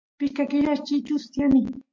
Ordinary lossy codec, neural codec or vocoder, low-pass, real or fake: MP3, 48 kbps; none; 7.2 kHz; real